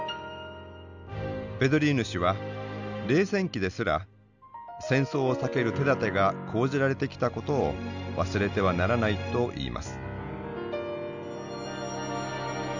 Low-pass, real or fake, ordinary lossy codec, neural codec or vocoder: 7.2 kHz; real; none; none